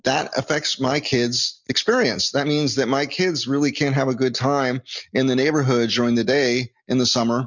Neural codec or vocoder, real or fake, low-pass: none; real; 7.2 kHz